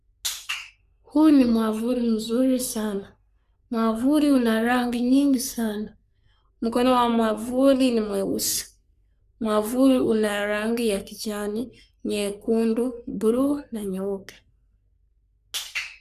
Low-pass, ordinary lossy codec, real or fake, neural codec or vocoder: 14.4 kHz; none; fake; codec, 44.1 kHz, 3.4 kbps, Pupu-Codec